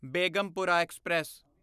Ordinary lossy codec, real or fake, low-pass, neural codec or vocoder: none; real; 14.4 kHz; none